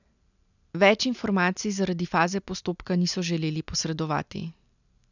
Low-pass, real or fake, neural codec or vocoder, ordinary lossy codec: 7.2 kHz; real; none; none